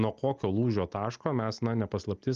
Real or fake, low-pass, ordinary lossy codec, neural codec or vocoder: real; 7.2 kHz; Opus, 32 kbps; none